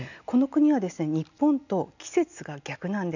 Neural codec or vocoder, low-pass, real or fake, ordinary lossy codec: none; 7.2 kHz; real; none